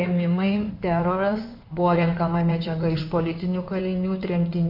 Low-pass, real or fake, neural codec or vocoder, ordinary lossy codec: 5.4 kHz; fake; codec, 16 kHz in and 24 kHz out, 2.2 kbps, FireRedTTS-2 codec; MP3, 48 kbps